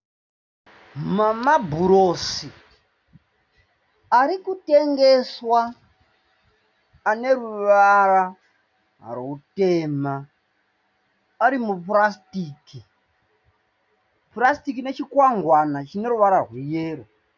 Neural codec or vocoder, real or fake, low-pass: none; real; 7.2 kHz